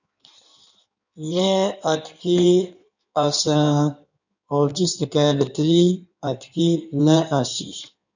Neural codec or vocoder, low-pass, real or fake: codec, 16 kHz in and 24 kHz out, 1.1 kbps, FireRedTTS-2 codec; 7.2 kHz; fake